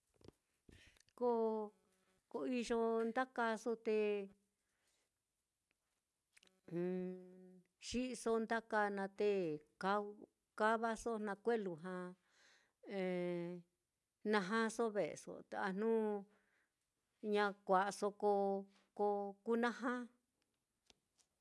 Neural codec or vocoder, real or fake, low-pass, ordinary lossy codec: none; real; none; none